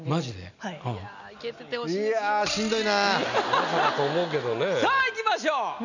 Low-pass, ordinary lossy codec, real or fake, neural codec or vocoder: 7.2 kHz; none; real; none